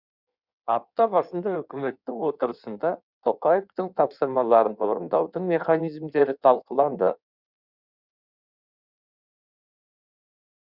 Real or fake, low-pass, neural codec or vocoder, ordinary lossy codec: fake; 5.4 kHz; codec, 16 kHz in and 24 kHz out, 1.1 kbps, FireRedTTS-2 codec; Opus, 64 kbps